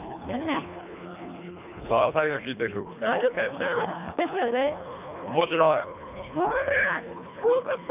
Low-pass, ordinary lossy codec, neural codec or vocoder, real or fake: 3.6 kHz; none; codec, 24 kHz, 1.5 kbps, HILCodec; fake